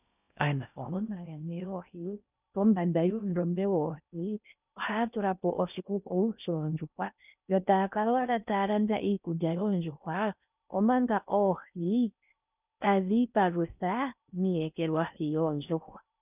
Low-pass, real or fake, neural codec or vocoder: 3.6 kHz; fake; codec, 16 kHz in and 24 kHz out, 0.6 kbps, FocalCodec, streaming, 4096 codes